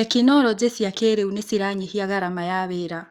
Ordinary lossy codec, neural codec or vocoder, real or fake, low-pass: Opus, 64 kbps; codec, 44.1 kHz, 7.8 kbps, DAC; fake; 19.8 kHz